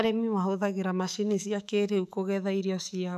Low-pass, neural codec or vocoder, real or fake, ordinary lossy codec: 14.4 kHz; autoencoder, 48 kHz, 128 numbers a frame, DAC-VAE, trained on Japanese speech; fake; none